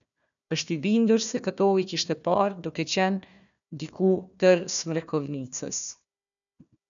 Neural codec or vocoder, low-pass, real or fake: codec, 16 kHz, 1 kbps, FunCodec, trained on Chinese and English, 50 frames a second; 7.2 kHz; fake